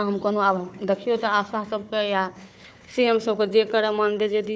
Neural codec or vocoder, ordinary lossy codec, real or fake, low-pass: codec, 16 kHz, 4 kbps, FunCodec, trained on Chinese and English, 50 frames a second; none; fake; none